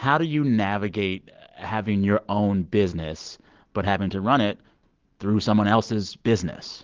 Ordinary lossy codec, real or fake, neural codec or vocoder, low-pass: Opus, 16 kbps; real; none; 7.2 kHz